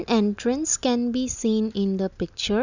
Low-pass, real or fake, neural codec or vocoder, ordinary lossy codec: 7.2 kHz; real; none; none